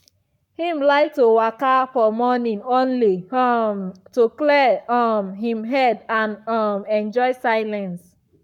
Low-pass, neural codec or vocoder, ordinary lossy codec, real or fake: 19.8 kHz; codec, 44.1 kHz, 7.8 kbps, Pupu-Codec; none; fake